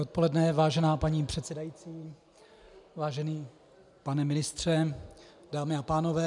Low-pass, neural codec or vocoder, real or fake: 10.8 kHz; none; real